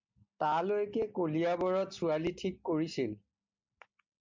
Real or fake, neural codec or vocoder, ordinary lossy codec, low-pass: real; none; MP3, 64 kbps; 7.2 kHz